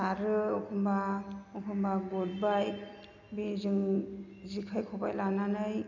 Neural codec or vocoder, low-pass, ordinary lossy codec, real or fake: none; 7.2 kHz; none; real